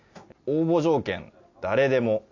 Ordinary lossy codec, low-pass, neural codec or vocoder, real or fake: AAC, 48 kbps; 7.2 kHz; none; real